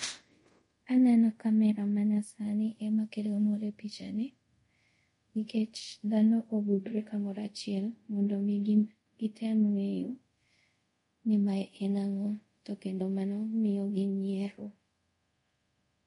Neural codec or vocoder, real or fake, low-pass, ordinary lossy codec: codec, 24 kHz, 0.5 kbps, DualCodec; fake; 10.8 kHz; MP3, 48 kbps